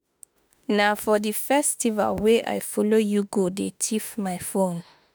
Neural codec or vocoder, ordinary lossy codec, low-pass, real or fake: autoencoder, 48 kHz, 32 numbers a frame, DAC-VAE, trained on Japanese speech; none; none; fake